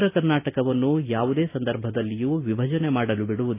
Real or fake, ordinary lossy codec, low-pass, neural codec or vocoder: real; AAC, 24 kbps; 3.6 kHz; none